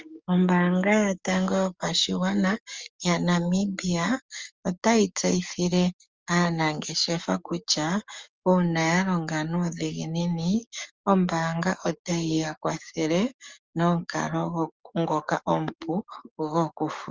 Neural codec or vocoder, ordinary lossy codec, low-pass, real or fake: codec, 44.1 kHz, 7.8 kbps, DAC; Opus, 32 kbps; 7.2 kHz; fake